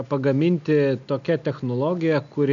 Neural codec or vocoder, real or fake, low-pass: none; real; 7.2 kHz